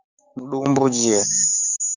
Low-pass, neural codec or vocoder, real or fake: 7.2 kHz; codec, 16 kHz, 6 kbps, DAC; fake